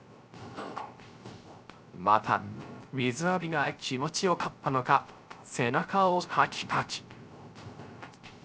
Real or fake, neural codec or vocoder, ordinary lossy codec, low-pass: fake; codec, 16 kHz, 0.3 kbps, FocalCodec; none; none